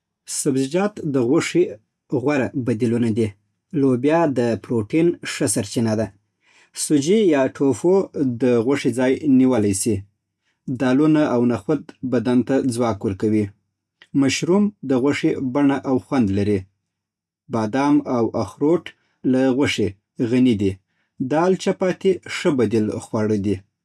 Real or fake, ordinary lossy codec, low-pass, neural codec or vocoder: real; none; none; none